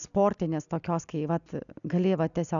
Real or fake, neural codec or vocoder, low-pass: real; none; 7.2 kHz